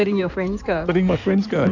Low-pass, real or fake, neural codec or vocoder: 7.2 kHz; fake; codec, 16 kHz in and 24 kHz out, 2.2 kbps, FireRedTTS-2 codec